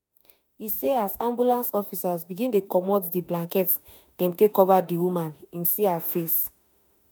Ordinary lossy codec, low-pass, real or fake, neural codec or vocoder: none; none; fake; autoencoder, 48 kHz, 32 numbers a frame, DAC-VAE, trained on Japanese speech